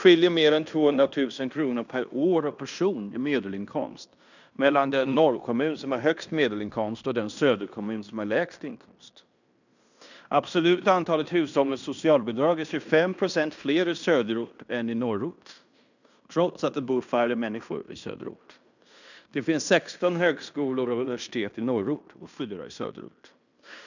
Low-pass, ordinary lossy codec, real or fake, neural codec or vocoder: 7.2 kHz; none; fake; codec, 16 kHz in and 24 kHz out, 0.9 kbps, LongCat-Audio-Codec, fine tuned four codebook decoder